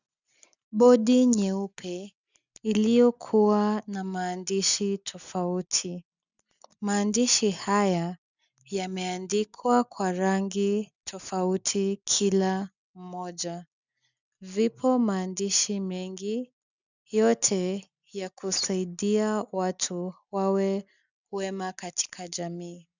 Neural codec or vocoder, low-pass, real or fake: none; 7.2 kHz; real